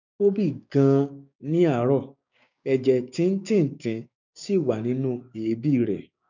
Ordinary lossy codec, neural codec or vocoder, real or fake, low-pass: none; codec, 16 kHz, 6 kbps, DAC; fake; 7.2 kHz